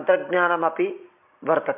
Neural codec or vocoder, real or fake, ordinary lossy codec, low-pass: none; real; MP3, 32 kbps; 3.6 kHz